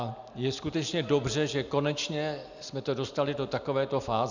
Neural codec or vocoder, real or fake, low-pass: none; real; 7.2 kHz